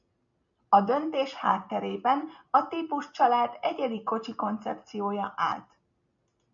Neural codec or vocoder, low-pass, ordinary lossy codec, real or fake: none; 7.2 kHz; MP3, 96 kbps; real